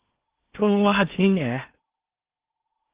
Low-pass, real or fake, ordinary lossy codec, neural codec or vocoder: 3.6 kHz; fake; Opus, 32 kbps; codec, 16 kHz in and 24 kHz out, 0.8 kbps, FocalCodec, streaming, 65536 codes